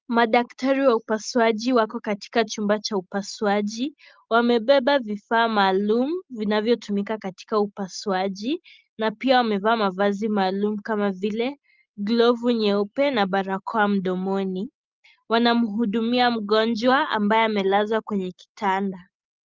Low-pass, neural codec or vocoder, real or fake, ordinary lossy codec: 7.2 kHz; none; real; Opus, 24 kbps